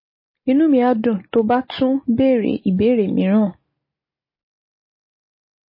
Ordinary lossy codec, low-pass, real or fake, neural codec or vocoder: MP3, 24 kbps; 5.4 kHz; real; none